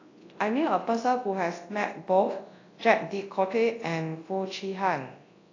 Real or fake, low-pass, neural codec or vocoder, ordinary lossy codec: fake; 7.2 kHz; codec, 24 kHz, 0.9 kbps, WavTokenizer, large speech release; AAC, 32 kbps